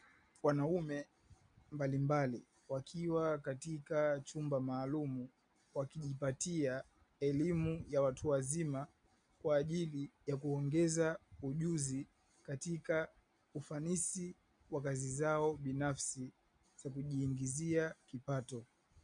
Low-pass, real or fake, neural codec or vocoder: 9.9 kHz; real; none